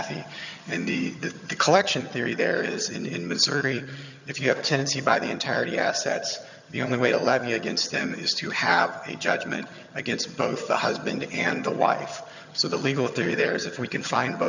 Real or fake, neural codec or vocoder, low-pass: fake; vocoder, 22.05 kHz, 80 mel bands, HiFi-GAN; 7.2 kHz